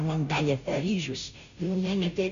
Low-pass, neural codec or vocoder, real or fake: 7.2 kHz; codec, 16 kHz, 0.5 kbps, FunCodec, trained on Chinese and English, 25 frames a second; fake